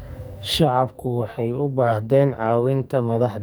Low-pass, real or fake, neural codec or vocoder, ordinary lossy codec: none; fake; codec, 44.1 kHz, 2.6 kbps, SNAC; none